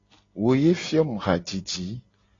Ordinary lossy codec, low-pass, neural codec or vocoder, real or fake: AAC, 32 kbps; 7.2 kHz; none; real